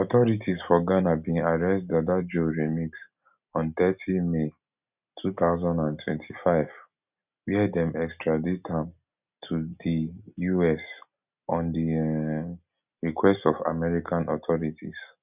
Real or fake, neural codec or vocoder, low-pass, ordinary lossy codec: real; none; 3.6 kHz; none